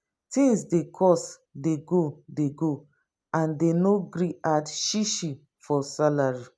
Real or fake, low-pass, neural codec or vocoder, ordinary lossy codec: real; none; none; none